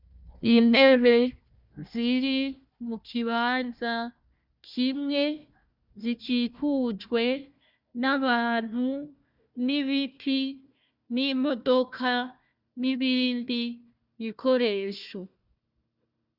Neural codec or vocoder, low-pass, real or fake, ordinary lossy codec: codec, 16 kHz, 1 kbps, FunCodec, trained on Chinese and English, 50 frames a second; 5.4 kHz; fake; Opus, 64 kbps